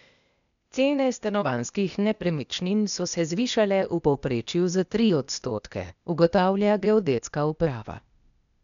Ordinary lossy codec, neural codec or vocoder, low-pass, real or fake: none; codec, 16 kHz, 0.8 kbps, ZipCodec; 7.2 kHz; fake